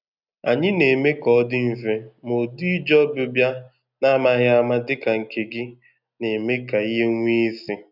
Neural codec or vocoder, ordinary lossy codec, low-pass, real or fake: none; none; 5.4 kHz; real